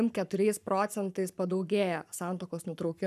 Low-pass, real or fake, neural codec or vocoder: 14.4 kHz; fake; codec, 44.1 kHz, 7.8 kbps, Pupu-Codec